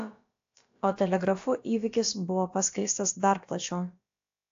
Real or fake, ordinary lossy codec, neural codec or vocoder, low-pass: fake; AAC, 48 kbps; codec, 16 kHz, about 1 kbps, DyCAST, with the encoder's durations; 7.2 kHz